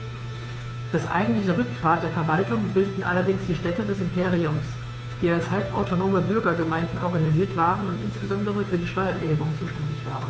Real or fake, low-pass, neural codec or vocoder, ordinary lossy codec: fake; none; codec, 16 kHz, 2 kbps, FunCodec, trained on Chinese and English, 25 frames a second; none